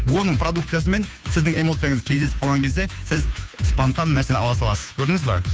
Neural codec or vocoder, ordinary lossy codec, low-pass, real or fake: codec, 16 kHz, 2 kbps, FunCodec, trained on Chinese and English, 25 frames a second; none; none; fake